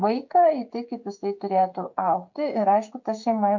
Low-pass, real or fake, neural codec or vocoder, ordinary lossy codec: 7.2 kHz; fake; codec, 16 kHz, 16 kbps, FreqCodec, smaller model; MP3, 48 kbps